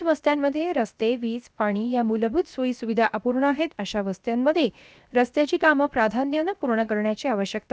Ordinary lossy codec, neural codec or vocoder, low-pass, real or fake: none; codec, 16 kHz, 0.7 kbps, FocalCodec; none; fake